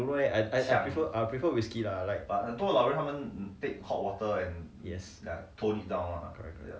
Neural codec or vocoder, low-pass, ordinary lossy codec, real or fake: none; none; none; real